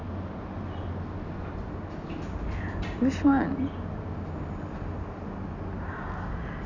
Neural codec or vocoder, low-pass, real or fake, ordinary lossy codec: none; 7.2 kHz; real; none